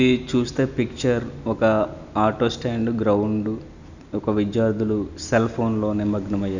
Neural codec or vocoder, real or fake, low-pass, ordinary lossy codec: none; real; 7.2 kHz; none